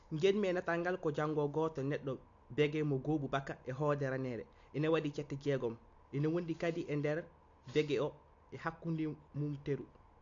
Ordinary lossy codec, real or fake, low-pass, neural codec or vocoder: AAC, 48 kbps; real; 7.2 kHz; none